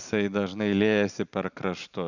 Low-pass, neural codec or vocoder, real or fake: 7.2 kHz; none; real